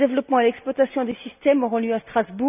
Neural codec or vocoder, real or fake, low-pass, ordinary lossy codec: none; real; 3.6 kHz; none